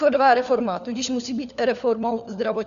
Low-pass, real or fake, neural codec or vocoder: 7.2 kHz; fake; codec, 16 kHz, 4 kbps, FunCodec, trained on LibriTTS, 50 frames a second